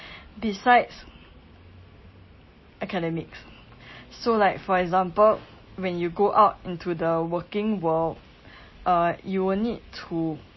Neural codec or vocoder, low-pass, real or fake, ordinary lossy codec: none; 7.2 kHz; real; MP3, 24 kbps